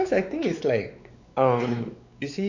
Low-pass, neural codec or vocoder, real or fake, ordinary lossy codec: 7.2 kHz; codec, 16 kHz, 4 kbps, X-Codec, WavLM features, trained on Multilingual LibriSpeech; fake; none